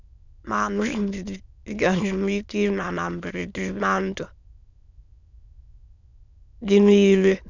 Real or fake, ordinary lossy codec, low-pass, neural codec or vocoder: fake; none; 7.2 kHz; autoencoder, 22.05 kHz, a latent of 192 numbers a frame, VITS, trained on many speakers